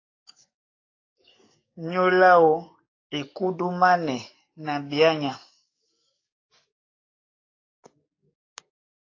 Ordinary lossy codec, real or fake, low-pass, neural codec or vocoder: AAC, 32 kbps; fake; 7.2 kHz; codec, 44.1 kHz, 7.8 kbps, DAC